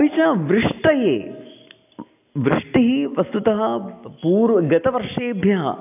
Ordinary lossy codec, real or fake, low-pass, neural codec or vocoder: AAC, 32 kbps; real; 3.6 kHz; none